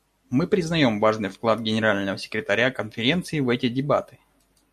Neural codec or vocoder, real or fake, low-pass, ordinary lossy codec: none; real; 14.4 kHz; MP3, 64 kbps